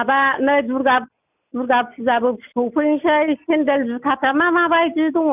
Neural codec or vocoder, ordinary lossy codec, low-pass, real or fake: none; none; 3.6 kHz; real